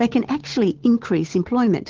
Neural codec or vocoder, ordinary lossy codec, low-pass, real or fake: codec, 16 kHz, 8 kbps, FunCodec, trained on Chinese and English, 25 frames a second; Opus, 16 kbps; 7.2 kHz; fake